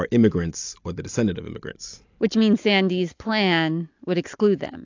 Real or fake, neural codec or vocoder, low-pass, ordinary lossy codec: real; none; 7.2 kHz; AAC, 48 kbps